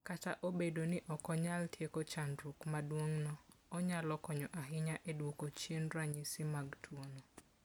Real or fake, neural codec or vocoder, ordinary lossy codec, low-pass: real; none; none; none